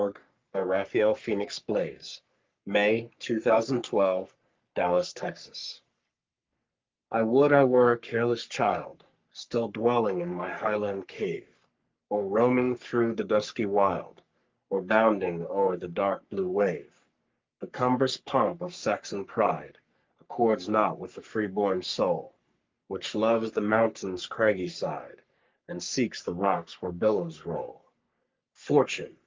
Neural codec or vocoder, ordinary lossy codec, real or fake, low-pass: codec, 44.1 kHz, 3.4 kbps, Pupu-Codec; Opus, 32 kbps; fake; 7.2 kHz